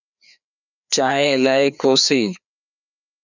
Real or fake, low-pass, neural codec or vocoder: fake; 7.2 kHz; codec, 16 kHz, 2 kbps, FreqCodec, larger model